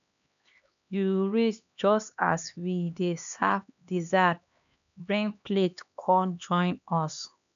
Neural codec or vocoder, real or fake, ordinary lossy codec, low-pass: codec, 16 kHz, 2 kbps, X-Codec, HuBERT features, trained on LibriSpeech; fake; none; 7.2 kHz